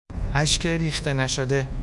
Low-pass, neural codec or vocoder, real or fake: 10.8 kHz; codec, 24 kHz, 1.2 kbps, DualCodec; fake